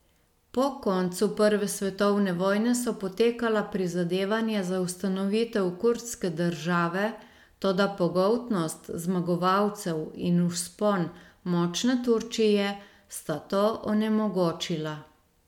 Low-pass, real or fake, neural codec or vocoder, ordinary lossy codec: 19.8 kHz; real; none; MP3, 96 kbps